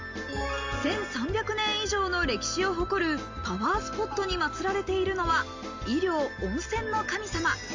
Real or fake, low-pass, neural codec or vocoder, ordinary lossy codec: real; 7.2 kHz; none; Opus, 32 kbps